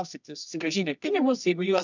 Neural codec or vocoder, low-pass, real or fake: codec, 24 kHz, 0.9 kbps, WavTokenizer, medium music audio release; 7.2 kHz; fake